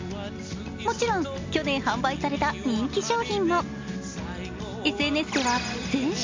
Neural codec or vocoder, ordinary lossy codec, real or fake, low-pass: none; MP3, 64 kbps; real; 7.2 kHz